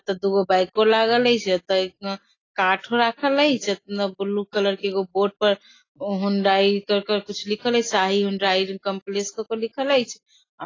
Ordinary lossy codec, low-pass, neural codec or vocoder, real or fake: AAC, 32 kbps; 7.2 kHz; none; real